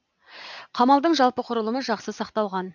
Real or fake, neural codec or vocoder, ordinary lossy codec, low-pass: real; none; none; 7.2 kHz